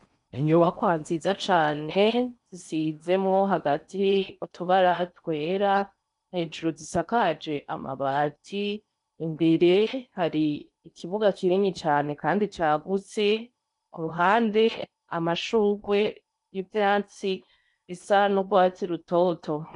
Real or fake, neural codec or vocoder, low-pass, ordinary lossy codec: fake; codec, 16 kHz in and 24 kHz out, 0.8 kbps, FocalCodec, streaming, 65536 codes; 10.8 kHz; MP3, 96 kbps